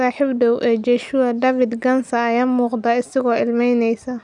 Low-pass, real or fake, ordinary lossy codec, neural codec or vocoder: 10.8 kHz; real; none; none